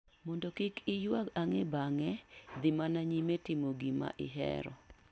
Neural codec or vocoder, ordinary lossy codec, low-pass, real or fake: none; none; none; real